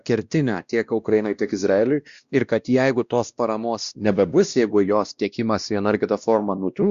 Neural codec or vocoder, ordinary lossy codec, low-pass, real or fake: codec, 16 kHz, 1 kbps, X-Codec, WavLM features, trained on Multilingual LibriSpeech; Opus, 64 kbps; 7.2 kHz; fake